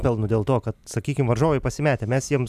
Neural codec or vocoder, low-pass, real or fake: none; 14.4 kHz; real